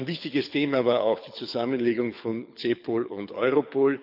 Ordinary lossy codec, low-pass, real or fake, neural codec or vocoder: none; 5.4 kHz; fake; codec, 24 kHz, 6 kbps, HILCodec